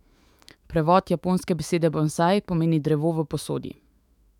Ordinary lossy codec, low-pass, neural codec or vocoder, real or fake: none; 19.8 kHz; autoencoder, 48 kHz, 128 numbers a frame, DAC-VAE, trained on Japanese speech; fake